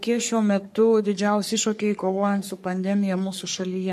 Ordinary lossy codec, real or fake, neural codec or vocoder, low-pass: MP3, 64 kbps; fake; codec, 44.1 kHz, 3.4 kbps, Pupu-Codec; 14.4 kHz